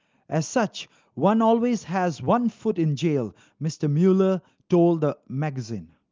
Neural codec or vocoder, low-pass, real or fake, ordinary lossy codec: none; 7.2 kHz; real; Opus, 32 kbps